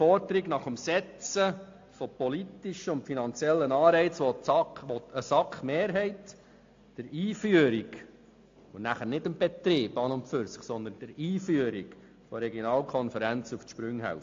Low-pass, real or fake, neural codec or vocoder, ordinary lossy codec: 7.2 kHz; real; none; AAC, 48 kbps